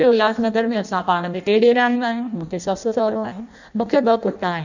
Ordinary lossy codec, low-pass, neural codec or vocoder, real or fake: none; 7.2 kHz; codec, 16 kHz in and 24 kHz out, 0.6 kbps, FireRedTTS-2 codec; fake